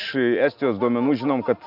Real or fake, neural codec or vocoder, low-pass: real; none; 5.4 kHz